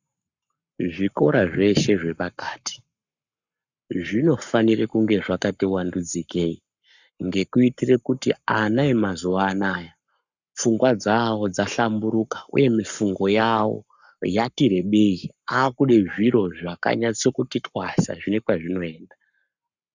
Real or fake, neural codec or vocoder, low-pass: fake; codec, 44.1 kHz, 7.8 kbps, Pupu-Codec; 7.2 kHz